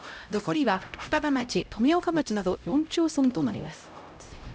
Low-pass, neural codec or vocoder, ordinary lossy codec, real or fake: none; codec, 16 kHz, 0.5 kbps, X-Codec, HuBERT features, trained on LibriSpeech; none; fake